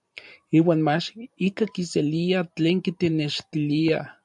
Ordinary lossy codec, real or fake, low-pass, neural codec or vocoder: MP3, 64 kbps; fake; 10.8 kHz; vocoder, 44.1 kHz, 128 mel bands every 512 samples, BigVGAN v2